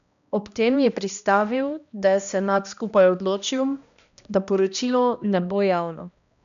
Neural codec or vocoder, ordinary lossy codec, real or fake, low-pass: codec, 16 kHz, 1 kbps, X-Codec, HuBERT features, trained on balanced general audio; none; fake; 7.2 kHz